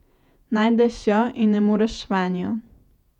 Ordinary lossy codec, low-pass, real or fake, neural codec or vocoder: none; 19.8 kHz; fake; vocoder, 48 kHz, 128 mel bands, Vocos